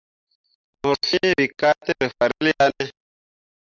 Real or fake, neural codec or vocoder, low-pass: real; none; 7.2 kHz